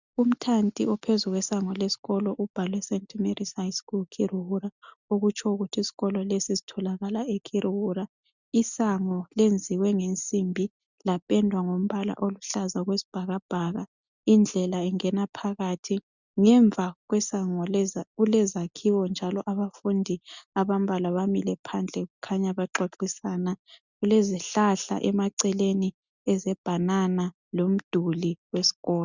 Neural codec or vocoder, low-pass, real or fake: none; 7.2 kHz; real